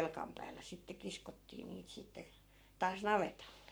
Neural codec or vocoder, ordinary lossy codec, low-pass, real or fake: codec, 44.1 kHz, 7.8 kbps, Pupu-Codec; none; none; fake